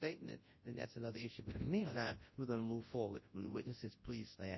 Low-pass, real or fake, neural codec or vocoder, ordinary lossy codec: 7.2 kHz; fake; codec, 24 kHz, 0.9 kbps, WavTokenizer, large speech release; MP3, 24 kbps